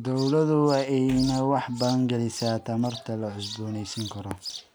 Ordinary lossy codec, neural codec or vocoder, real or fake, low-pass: none; none; real; none